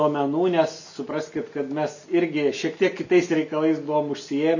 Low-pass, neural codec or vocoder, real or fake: 7.2 kHz; none; real